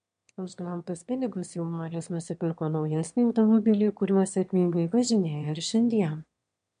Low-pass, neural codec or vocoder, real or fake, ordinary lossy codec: 9.9 kHz; autoencoder, 22.05 kHz, a latent of 192 numbers a frame, VITS, trained on one speaker; fake; MP3, 64 kbps